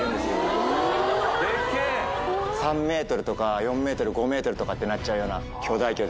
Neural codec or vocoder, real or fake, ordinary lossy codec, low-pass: none; real; none; none